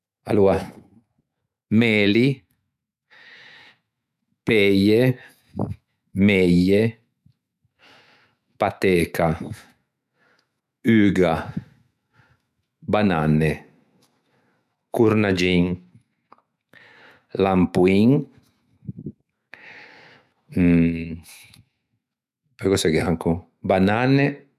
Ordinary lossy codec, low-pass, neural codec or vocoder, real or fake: none; 14.4 kHz; autoencoder, 48 kHz, 128 numbers a frame, DAC-VAE, trained on Japanese speech; fake